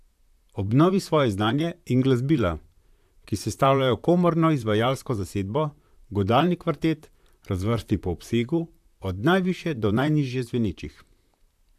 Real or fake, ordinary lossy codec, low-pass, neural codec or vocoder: fake; AAC, 96 kbps; 14.4 kHz; vocoder, 44.1 kHz, 128 mel bands, Pupu-Vocoder